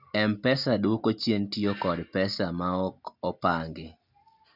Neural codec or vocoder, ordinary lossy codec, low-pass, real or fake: none; none; 5.4 kHz; real